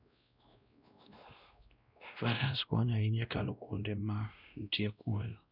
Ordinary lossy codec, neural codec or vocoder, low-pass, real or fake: none; codec, 16 kHz, 1 kbps, X-Codec, WavLM features, trained on Multilingual LibriSpeech; 5.4 kHz; fake